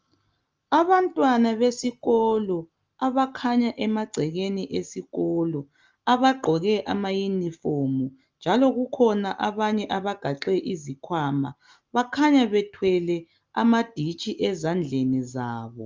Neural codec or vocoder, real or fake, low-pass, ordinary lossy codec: none; real; 7.2 kHz; Opus, 24 kbps